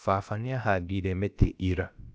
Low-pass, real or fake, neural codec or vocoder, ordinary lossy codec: none; fake; codec, 16 kHz, about 1 kbps, DyCAST, with the encoder's durations; none